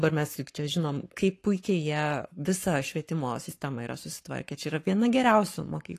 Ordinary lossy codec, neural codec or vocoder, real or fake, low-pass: AAC, 48 kbps; codec, 44.1 kHz, 7.8 kbps, Pupu-Codec; fake; 14.4 kHz